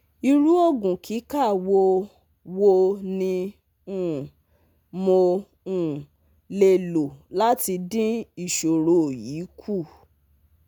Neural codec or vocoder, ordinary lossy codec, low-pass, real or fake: none; none; 19.8 kHz; real